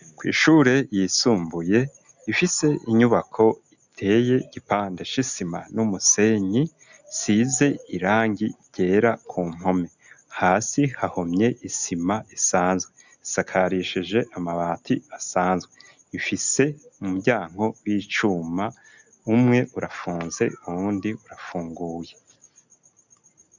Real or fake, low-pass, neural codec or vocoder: real; 7.2 kHz; none